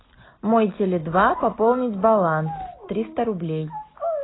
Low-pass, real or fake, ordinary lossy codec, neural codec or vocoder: 7.2 kHz; real; AAC, 16 kbps; none